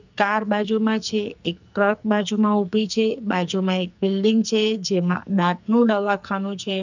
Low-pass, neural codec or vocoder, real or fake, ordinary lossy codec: 7.2 kHz; codec, 44.1 kHz, 2.6 kbps, SNAC; fake; none